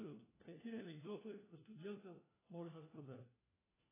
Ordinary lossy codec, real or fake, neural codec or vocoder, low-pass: AAC, 16 kbps; fake; codec, 16 kHz, 1 kbps, FunCodec, trained on LibriTTS, 50 frames a second; 3.6 kHz